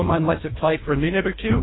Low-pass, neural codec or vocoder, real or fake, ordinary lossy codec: 7.2 kHz; codec, 24 kHz, 1.5 kbps, HILCodec; fake; AAC, 16 kbps